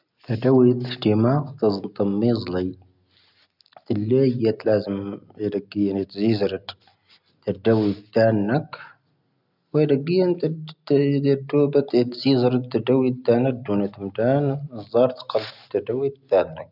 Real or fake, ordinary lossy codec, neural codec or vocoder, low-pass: real; none; none; 5.4 kHz